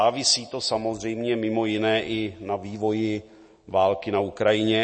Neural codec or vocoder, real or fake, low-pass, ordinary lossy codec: none; real; 9.9 kHz; MP3, 32 kbps